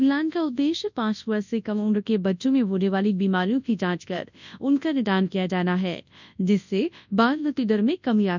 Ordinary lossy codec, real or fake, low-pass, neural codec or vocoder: none; fake; 7.2 kHz; codec, 24 kHz, 0.9 kbps, WavTokenizer, large speech release